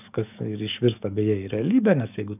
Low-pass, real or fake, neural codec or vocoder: 3.6 kHz; fake; codec, 44.1 kHz, 7.8 kbps, DAC